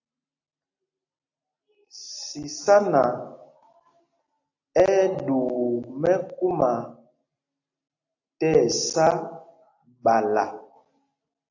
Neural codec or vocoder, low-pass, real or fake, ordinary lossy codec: none; 7.2 kHz; real; AAC, 48 kbps